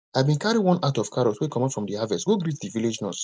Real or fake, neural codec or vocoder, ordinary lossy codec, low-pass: real; none; none; none